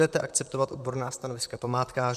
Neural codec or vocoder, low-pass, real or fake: vocoder, 44.1 kHz, 128 mel bands, Pupu-Vocoder; 14.4 kHz; fake